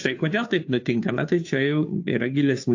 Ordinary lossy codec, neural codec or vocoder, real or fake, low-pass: AAC, 48 kbps; codec, 16 kHz, 2 kbps, FunCodec, trained on Chinese and English, 25 frames a second; fake; 7.2 kHz